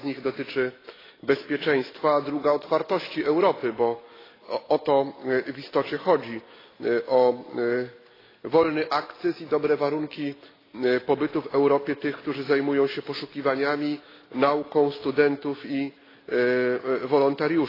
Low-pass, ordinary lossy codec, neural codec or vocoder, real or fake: 5.4 kHz; AAC, 24 kbps; none; real